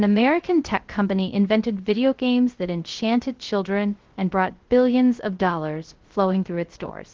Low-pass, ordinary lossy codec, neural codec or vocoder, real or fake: 7.2 kHz; Opus, 16 kbps; codec, 16 kHz, 0.3 kbps, FocalCodec; fake